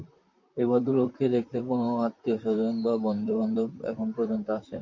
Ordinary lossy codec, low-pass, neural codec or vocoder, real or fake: MP3, 48 kbps; 7.2 kHz; vocoder, 44.1 kHz, 128 mel bands, Pupu-Vocoder; fake